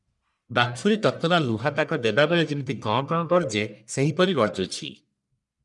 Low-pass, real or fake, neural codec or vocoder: 10.8 kHz; fake; codec, 44.1 kHz, 1.7 kbps, Pupu-Codec